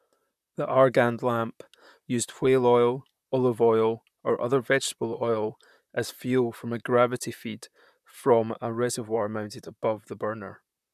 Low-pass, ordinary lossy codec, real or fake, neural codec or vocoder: 14.4 kHz; none; fake; vocoder, 44.1 kHz, 128 mel bands, Pupu-Vocoder